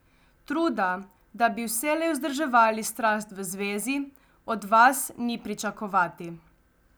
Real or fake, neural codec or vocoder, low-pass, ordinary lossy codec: real; none; none; none